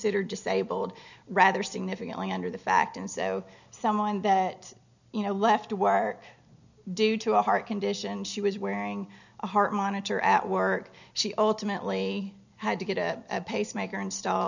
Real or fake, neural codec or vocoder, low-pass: real; none; 7.2 kHz